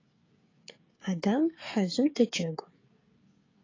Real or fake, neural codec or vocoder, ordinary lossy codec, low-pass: fake; codec, 16 kHz, 16 kbps, FreqCodec, smaller model; AAC, 32 kbps; 7.2 kHz